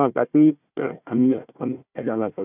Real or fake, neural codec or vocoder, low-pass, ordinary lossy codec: fake; codec, 16 kHz, 1 kbps, FunCodec, trained on Chinese and English, 50 frames a second; 3.6 kHz; none